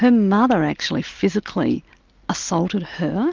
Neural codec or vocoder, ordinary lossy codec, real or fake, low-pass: none; Opus, 24 kbps; real; 7.2 kHz